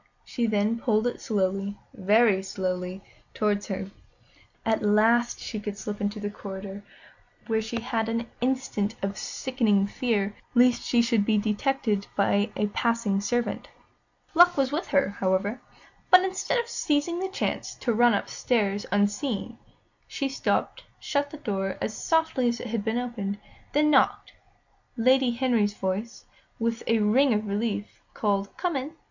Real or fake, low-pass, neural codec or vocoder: real; 7.2 kHz; none